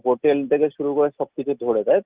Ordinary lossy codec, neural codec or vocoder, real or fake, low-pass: Opus, 32 kbps; none; real; 3.6 kHz